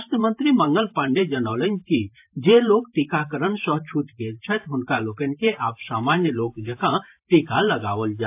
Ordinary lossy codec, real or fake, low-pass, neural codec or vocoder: AAC, 32 kbps; fake; 3.6 kHz; vocoder, 44.1 kHz, 128 mel bands every 512 samples, BigVGAN v2